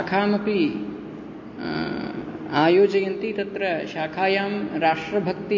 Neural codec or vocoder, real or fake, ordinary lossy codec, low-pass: none; real; MP3, 32 kbps; 7.2 kHz